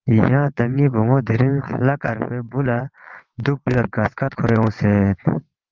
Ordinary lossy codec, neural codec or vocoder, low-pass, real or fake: Opus, 32 kbps; vocoder, 22.05 kHz, 80 mel bands, WaveNeXt; 7.2 kHz; fake